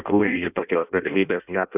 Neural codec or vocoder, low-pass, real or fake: codec, 16 kHz in and 24 kHz out, 0.6 kbps, FireRedTTS-2 codec; 3.6 kHz; fake